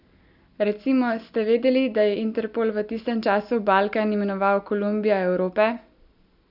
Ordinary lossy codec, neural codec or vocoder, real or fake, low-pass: none; none; real; 5.4 kHz